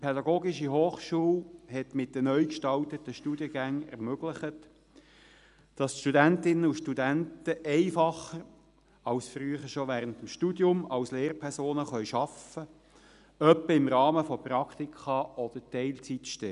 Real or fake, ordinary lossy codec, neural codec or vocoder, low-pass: real; none; none; 10.8 kHz